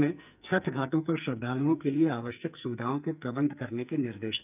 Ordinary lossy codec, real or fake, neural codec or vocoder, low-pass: none; fake; codec, 44.1 kHz, 2.6 kbps, SNAC; 3.6 kHz